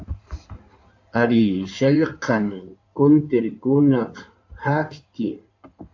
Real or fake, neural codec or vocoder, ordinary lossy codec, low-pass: fake; codec, 16 kHz in and 24 kHz out, 2.2 kbps, FireRedTTS-2 codec; AAC, 48 kbps; 7.2 kHz